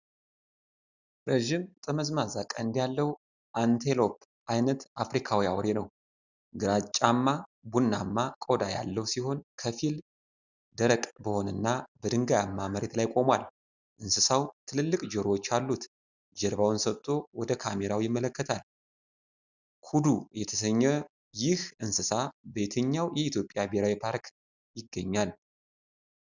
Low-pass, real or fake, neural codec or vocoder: 7.2 kHz; real; none